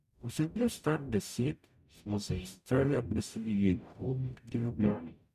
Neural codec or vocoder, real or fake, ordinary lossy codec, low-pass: codec, 44.1 kHz, 0.9 kbps, DAC; fake; none; 14.4 kHz